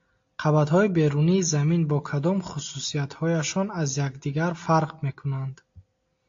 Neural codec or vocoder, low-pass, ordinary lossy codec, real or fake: none; 7.2 kHz; AAC, 48 kbps; real